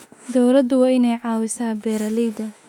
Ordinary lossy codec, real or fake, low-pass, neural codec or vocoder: none; fake; 19.8 kHz; autoencoder, 48 kHz, 32 numbers a frame, DAC-VAE, trained on Japanese speech